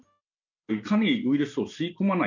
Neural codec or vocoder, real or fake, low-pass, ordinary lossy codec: none; real; 7.2 kHz; none